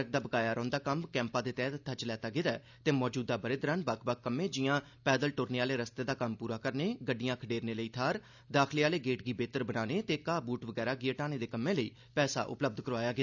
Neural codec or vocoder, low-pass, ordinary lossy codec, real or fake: none; 7.2 kHz; none; real